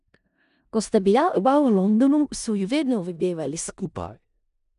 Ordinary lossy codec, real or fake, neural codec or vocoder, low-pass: none; fake; codec, 16 kHz in and 24 kHz out, 0.4 kbps, LongCat-Audio-Codec, four codebook decoder; 10.8 kHz